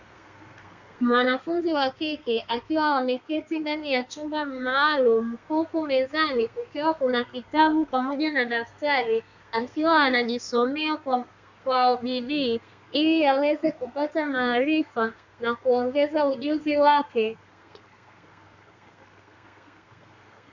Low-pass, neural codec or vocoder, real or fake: 7.2 kHz; codec, 32 kHz, 1.9 kbps, SNAC; fake